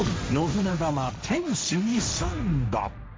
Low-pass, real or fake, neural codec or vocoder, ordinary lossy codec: none; fake; codec, 16 kHz, 1.1 kbps, Voila-Tokenizer; none